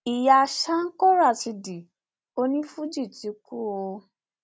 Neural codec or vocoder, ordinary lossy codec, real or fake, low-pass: none; none; real; none